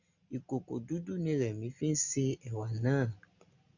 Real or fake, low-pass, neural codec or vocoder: real; 7.2 kHz; none